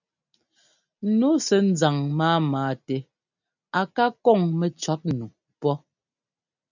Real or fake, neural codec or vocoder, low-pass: real; none; 7.2 kHz